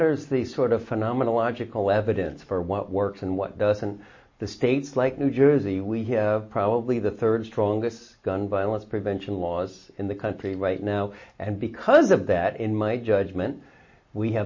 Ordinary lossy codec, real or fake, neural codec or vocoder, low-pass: MP3, 32 kbps; real; none; 7.2 kHz